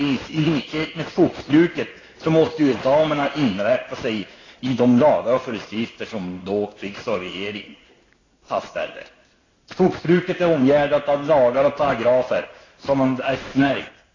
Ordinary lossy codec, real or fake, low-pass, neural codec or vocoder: AAC, 32 kbps; fake; 7.2 kHz; codec, 16 kHz in and 24 kHz out, 1 kbps, XY-Tokenizer